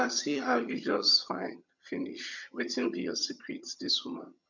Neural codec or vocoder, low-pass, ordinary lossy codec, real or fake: vocoder, 22.05 kHz, 80 mel bands, HiFi-GAN; 7.2 kHz; none; fake